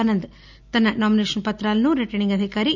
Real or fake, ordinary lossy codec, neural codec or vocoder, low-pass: real; none; none; 7.2 kHz